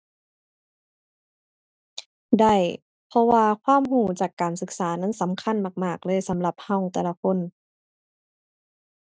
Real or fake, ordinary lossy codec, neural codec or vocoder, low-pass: real; none; none; none